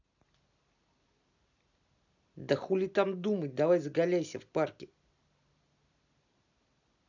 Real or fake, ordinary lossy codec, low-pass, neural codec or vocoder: real; none; 7.2 kHz; none